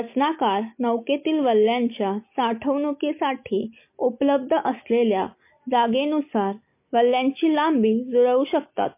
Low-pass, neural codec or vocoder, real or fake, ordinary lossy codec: 3.6 kHz; none; real; MP3, 24 kbps